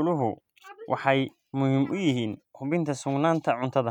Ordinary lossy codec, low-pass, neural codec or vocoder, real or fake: none; 19.8 kHz; none; real